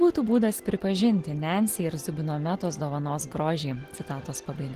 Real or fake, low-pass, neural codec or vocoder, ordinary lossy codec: real; 14.4 kHz; none; Opus, 16 kbps